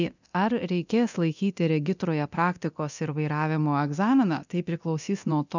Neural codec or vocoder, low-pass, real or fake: codec, 24 kHz, 0.9 kbps, DualCodec; 7.2 kHz; fake